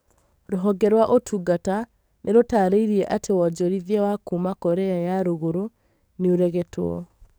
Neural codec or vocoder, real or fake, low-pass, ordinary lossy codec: codec, 44.1 kHz, 7.8 kbps, DAC; fake; none; none